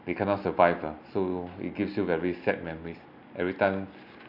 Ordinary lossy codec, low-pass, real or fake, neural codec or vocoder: none; 5.4 kHz; real; none